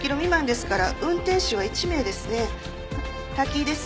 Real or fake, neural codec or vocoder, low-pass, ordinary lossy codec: real; none; none; none